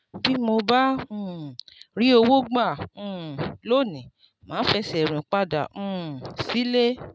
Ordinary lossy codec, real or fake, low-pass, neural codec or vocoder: none; real; none; none